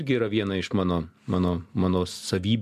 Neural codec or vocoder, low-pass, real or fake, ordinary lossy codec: none; 14.4 kHz; real; MP3, 96 kbps